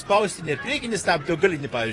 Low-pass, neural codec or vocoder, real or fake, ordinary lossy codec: 10.8 kHz; vocoder, 44.1 kHz, 128 mel bands, Pupu-Vocoder; fake; AAC, 32 kbps